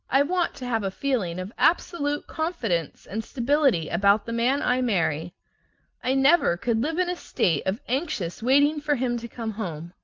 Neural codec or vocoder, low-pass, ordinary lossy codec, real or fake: none; 7.2 kHz; Opus, 32 kbps; real